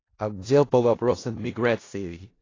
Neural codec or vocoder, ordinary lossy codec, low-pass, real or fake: codec, 16 kHz in and 24 kHz out, 0.4 kbps, LongCat-Audio-Codec, four codebook decoder; AAC, 32 kbps; 7.2 kHz; fake